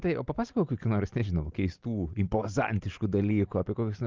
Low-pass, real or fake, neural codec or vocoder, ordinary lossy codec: 7.2 kHz; real; none; Opus, 32 kbps